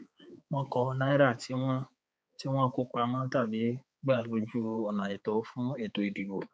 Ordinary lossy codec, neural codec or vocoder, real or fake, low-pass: none; codec, 16 kHz, 4 kbps, X-Codec, HuBERT features, trained on balanced general audio; fake; none